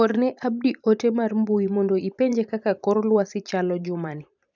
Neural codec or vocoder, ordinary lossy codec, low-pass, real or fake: vocoder, 44.1 kHz, 128 mel bands every 512 samples, BigVGAN v2; none; 7.2 kHz; fake